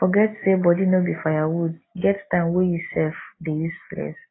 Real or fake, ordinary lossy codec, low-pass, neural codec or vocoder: real; AAC, 16 kbps; 7.2 kHz; none